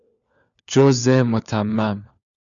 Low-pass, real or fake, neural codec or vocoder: 7.2 kHz; fake; codec, 16 kHz, 4 kbps, FunCodec, trained on LibriTTS, 50 frames a second